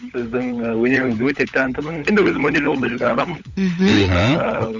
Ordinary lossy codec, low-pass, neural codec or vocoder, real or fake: none; 7.2 kHz; codec, 16 kHz, 16 kbps, FunCodec, trained on LibriTTS, 50 frames a second; fake